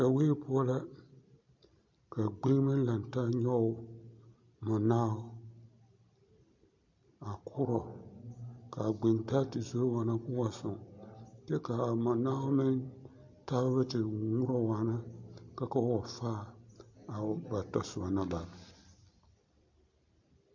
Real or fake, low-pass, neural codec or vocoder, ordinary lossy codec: real; 7.2 kHz; none; MP3, 48 kbps